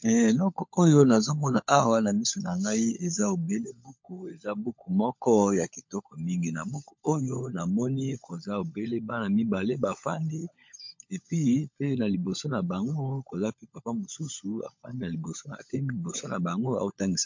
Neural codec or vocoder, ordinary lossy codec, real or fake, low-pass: codec, 16 kHz, 16 kbps, FunCodec, trained on LibriTTS, 50 frames a second; MP3, 48 kbps; fake; 7.2 kHz